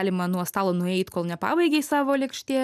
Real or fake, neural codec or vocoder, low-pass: real; none; 14.4 kHz